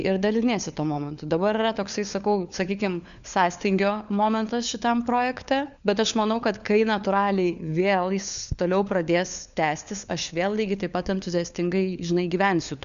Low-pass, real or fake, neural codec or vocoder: 7.2 kHz; fake; codec, 16 kHz, 4 kbps, FunCodec, trained on LibriTTS, 50 frames a second